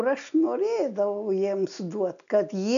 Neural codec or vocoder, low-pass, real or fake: none; 7.2 kHz; real